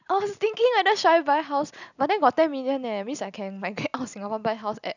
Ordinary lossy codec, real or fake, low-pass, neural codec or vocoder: none; real; 7.2 kHz; none